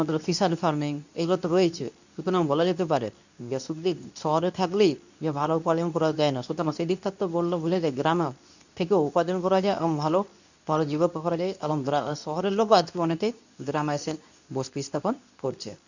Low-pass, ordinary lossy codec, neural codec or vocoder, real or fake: 7.2 kHz; none; codec, 24 kHz, 0.9 kbps, WavTokenizer, medium speech release version 2; fake